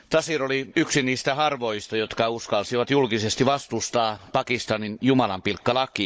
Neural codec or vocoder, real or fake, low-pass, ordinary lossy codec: codec, 16 kHz, 16 kbps, FunCodec, trained on Chinese and English, 50 frames a second; fake; none; none